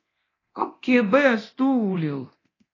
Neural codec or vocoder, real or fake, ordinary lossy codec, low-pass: codec, 24 kHz, 0.9 kbps, DualCodec; fake; AAC, 32 kbps; 7.2 kHz